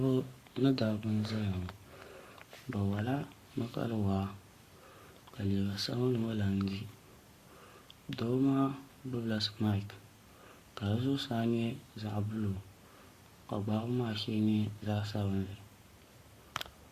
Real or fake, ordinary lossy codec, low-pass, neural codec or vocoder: fake; Opus, 64 kbps; 14.4 kHz; codec, 44.1 kHz, 7.8 kbps, Pupu-Codec